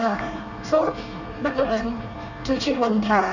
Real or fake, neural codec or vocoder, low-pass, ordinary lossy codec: fake; codec, 24 kHz, 1 kbps, SNAC; 7.2 kHz; none